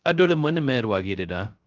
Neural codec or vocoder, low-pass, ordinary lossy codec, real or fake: codec, 16 kHz, 0.3 kbps, FocalCodec; 7.2 kHz; Opus, 32 kbps; fake